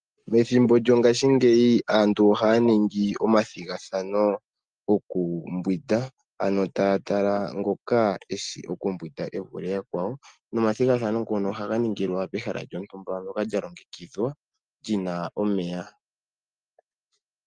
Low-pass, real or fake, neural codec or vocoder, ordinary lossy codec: 9.9 kHz; real; none; Opus, 32 kbps